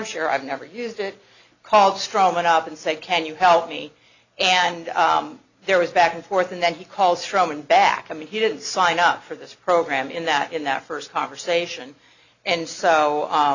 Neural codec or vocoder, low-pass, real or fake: none; 7.2 kHz; real